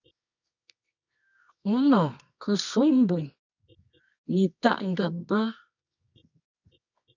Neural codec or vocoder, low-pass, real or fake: codec, 24 kHz, 0.9 kbps, WavTokenizer, medium music audio release; 7.2 kHz; fake